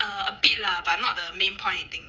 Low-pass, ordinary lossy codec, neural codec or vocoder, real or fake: none; none; codec, 16 kHz, 8 kbps, FreqCodec, smaller model; fake